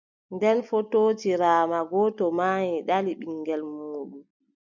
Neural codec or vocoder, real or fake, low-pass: none; real; 7.2 kHz